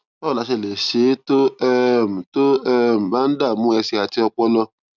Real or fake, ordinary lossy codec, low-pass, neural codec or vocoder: real; none; 7.2 kHz; none